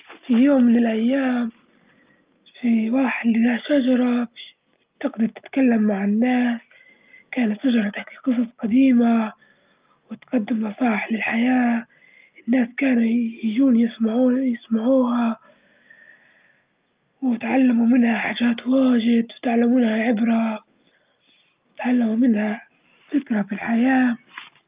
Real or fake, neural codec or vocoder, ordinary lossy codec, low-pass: real; none; Opus, 24 kbps; 3.6 kHz